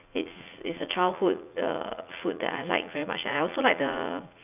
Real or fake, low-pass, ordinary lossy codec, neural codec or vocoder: fake; 3.6 kHz; none; vocoder, 44.1 kHz, 80 mel bands, Vocos